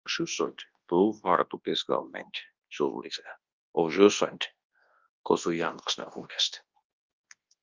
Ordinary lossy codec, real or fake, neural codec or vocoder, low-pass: Opus, 24 kbps; fake; codec, 24 kHz, 0.9 kbps, WavTokenizer, large speech release; 7.2 kHz